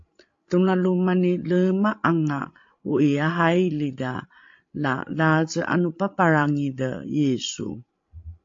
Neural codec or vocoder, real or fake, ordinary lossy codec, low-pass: codec, 16 kHz, 16 kbps, FreqCodec, larger model; fake; AAC, 48 kbps; 7.2 kHz